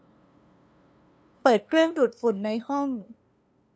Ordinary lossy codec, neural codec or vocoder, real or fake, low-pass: none; codec, 16 kHz, 2 kbps, FunCodec, trained on LibriTTS, 25 frames a second; fake; none